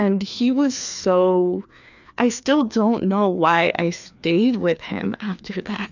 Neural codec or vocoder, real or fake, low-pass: codec, 16 kHz, 2 kbps, FreqCodec, larger model; fake; 7.2 kHz